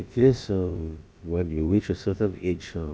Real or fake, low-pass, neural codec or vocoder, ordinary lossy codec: fake; none; codec, 16 kHz, about 1 kbps, DyCAST, with the encoder's durations; none